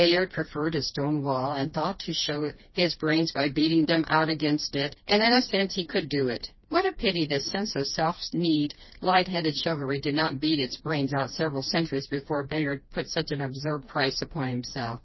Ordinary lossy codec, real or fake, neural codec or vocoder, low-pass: MP3, 24 kbps; fake; codec, 16 kHz, 2 kbps, FreqCodec, smaller model; 7.2 kHz